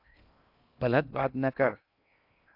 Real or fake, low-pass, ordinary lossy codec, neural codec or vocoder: fake; 5.4 kHz; AAC, 32 kbps; codec, 16 kHz in and 24 kHz out, 0.6 kbps, FocalCodec, streaming, 2048 codes